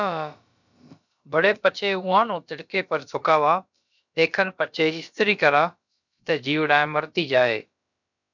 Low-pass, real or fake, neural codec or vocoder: 7.2 kHz; fake; codec, 16 kHz, about 1 kbps, DyCAST, with the encoder's durations